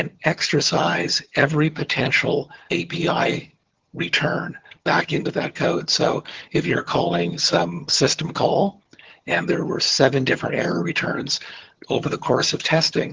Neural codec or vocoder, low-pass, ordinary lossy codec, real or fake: vocoder, 22.05 kHz, 80 mel bands, HiFi-GAN; 7.2 kHz; Opus, 16 kbps; fake